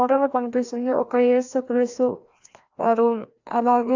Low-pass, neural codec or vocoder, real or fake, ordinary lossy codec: 7.2 kHz; codec, 16 kHz, 1 kbps, FreqCodec, larger model; fake; AAC, 48 kbps